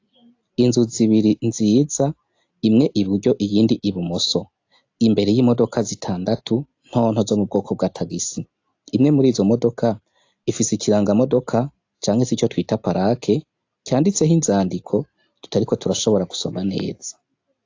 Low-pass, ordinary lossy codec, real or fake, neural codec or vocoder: 7.2 kHz; AAC, 48 kbps; real; none